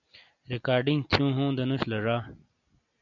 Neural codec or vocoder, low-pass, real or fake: none; 7.2 kHz; real